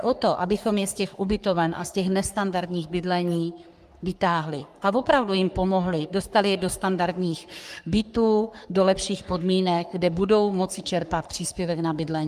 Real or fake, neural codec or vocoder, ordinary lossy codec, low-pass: fake; codec, 44.1 kHz, 3.4 kbps, Pupu-Codec; Opus, 32 kbps; 14.4 kHz